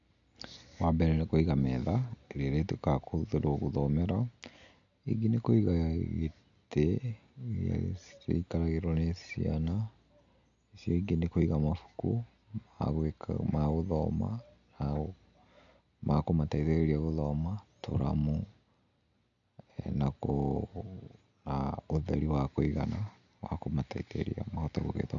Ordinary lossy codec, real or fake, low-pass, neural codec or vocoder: none; real; 7.2 kHz; none